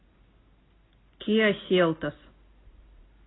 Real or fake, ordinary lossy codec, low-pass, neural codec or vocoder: real; AAC, 16 kbps; 7.2 kHz; none